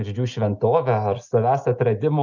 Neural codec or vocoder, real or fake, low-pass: none; real; 7.2 kHz